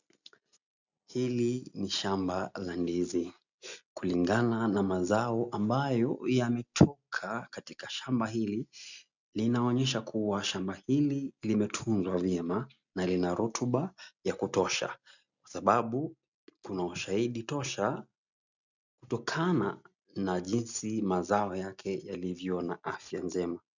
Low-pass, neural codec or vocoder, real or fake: 7.2 kHz; none; real